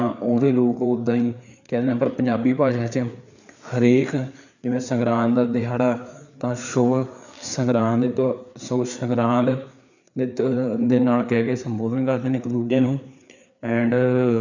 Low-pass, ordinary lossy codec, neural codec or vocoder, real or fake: 7.2 kHz; none; codec, 16 kHz, 4 kbps, FreqCodec, larger model; fake